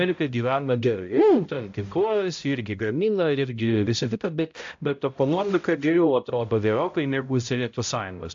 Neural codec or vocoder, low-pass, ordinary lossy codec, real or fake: codec, 16 kHz, 0.5 kbps, X-Codec, HuBERT features, trained on balanced general audio; 7.2 kHz; AAC, 64 kbps; fake